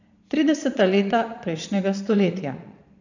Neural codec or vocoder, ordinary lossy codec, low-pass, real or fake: vocoder, 22.05 kHz, 80 mel bands, Vocos; none; 7.2 kHz; fake